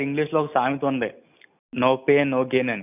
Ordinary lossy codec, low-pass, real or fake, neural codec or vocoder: none; 3.6 kHz; real; none